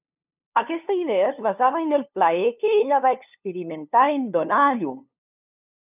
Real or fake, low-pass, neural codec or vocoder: fake; 3.6 kHz; codec, 16 kHz, 2 kbps, FunCodec, trained on LibriTTS, 25 frames a second